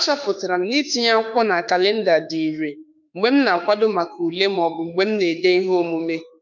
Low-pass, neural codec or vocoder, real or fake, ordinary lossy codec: 7.2 kHz; autoencoder, 48 kHz, 32 numbers a frame, DAC-VAE, trained on Japanese speech; fake; none